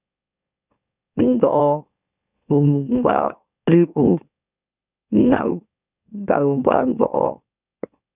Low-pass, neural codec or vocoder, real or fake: 3.6 kHz; autoencoder, 44.1 kHz, a latent of 192 numbers a frame, MeloTTS; fake